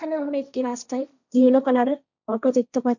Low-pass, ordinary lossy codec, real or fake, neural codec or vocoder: 7.2 kHz; none; fake; codec, 16 kHz, 1.1 kbps, Voila-Tokenizer